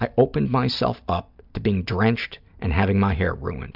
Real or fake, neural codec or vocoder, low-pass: real; none; 5.4 kHz